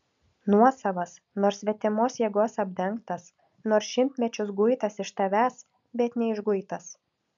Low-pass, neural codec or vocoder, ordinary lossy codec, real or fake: 7.2 kHz; none; MP3, 64 kbps; real